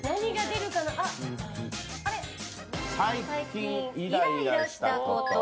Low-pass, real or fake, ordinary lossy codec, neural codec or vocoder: none; real; none; none